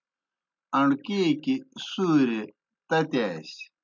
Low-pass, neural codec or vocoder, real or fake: 7.2 kHz; none; real